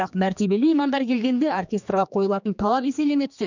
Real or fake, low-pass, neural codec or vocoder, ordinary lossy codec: fake; 7.2 kHz; codec, 16 kHz, 2 kbps, X-Codec, HuBERT features, trained on general audio; none